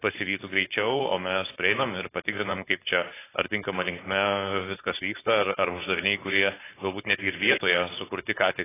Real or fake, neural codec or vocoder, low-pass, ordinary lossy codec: fake; codec, 16 kHz, 4.8 kbps, FACodec; 3.6 kHz; AAC, 16 kbps